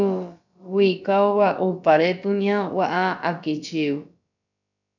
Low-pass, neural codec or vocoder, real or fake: 7.2 kHz; codec, 16 kHz, about 1 kbps, DyCAST, with the encoder's durations; fake